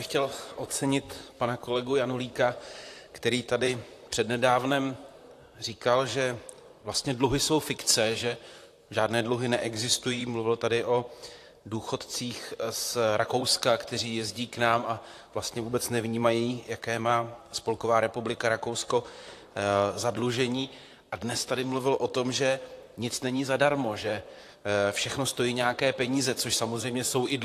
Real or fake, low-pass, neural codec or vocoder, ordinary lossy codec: fake; 14.4 kHz; vocoder, 44.1 kHz, 128 mel bands, Pupu-Vocoder; AAC, 64 kbps